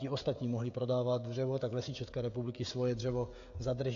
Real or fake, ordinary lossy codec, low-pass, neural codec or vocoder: fake; AAC, 48 kbps; 7.2 kHz; codec, 16 kHz, 16 kbps, FreqCodec, smaller model